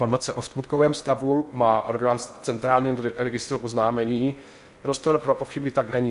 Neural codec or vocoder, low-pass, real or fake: codec, 16 kHz in and 24 kHz out, 0.6 kbps, FocalCodec, streaming, 4096 codes; 10.8 kHz; fake